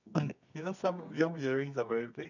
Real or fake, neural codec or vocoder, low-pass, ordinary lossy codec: fake; codec, 24 kHz, 0.9 kbps, WavTokenizer, medium music audio release; 7.2 kHz; none